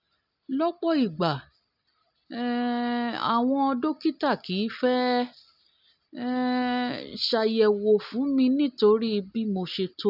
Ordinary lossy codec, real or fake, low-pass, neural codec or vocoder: none; real; 5.4 kHz; none